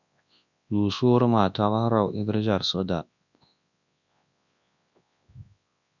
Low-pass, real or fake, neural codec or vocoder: 7.2 kHz; fake; codec, 24 kHz, 0.9 kbps, WavTokenizer, large speech release